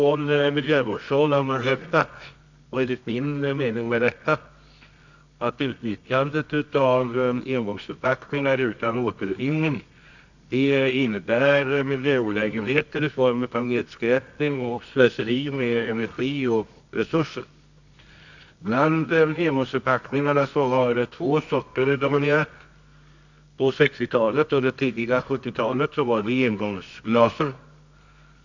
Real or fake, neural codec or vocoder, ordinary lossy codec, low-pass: fake; codec, 24 kHz, 0.9 kbps, WavTokenizer, medium music audio release; none; 7.2 kHz